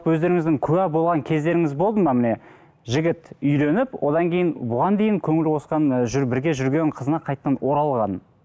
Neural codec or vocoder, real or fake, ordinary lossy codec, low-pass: none; real; none; none